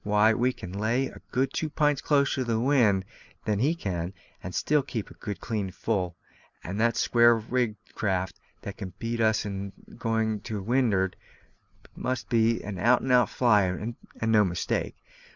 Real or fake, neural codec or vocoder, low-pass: real; none; 7.2 kHz